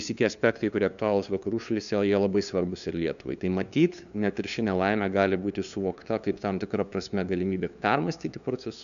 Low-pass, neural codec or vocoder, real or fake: 7.2 kHz; codec, 16 kHz, 2 kbps, FunCodec, trained on LibriTTS, 25 frames a second; fake